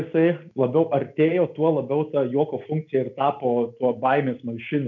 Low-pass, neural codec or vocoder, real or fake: 7.2 kHz; none; real